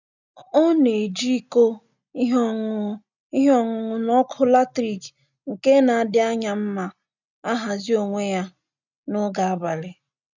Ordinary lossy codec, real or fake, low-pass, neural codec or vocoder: none; real; 7.2 kHz; none